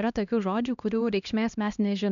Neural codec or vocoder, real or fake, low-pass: codec, 16 kHz, 1 kbps, X-Codec, HuBERT features, trained on LibriSpeech; fake; 7.2 kHz